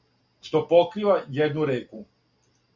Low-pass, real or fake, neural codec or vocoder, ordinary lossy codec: 7.2 kHz; real; none; Opus, 64 kbps